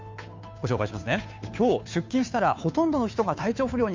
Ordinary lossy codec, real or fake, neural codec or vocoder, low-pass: MP3, 64 kbps; fake; codec, 16 kHz, 2 kbps, FunCodec, trained on Chinese and English, 25 frames a second; 7.2 kHz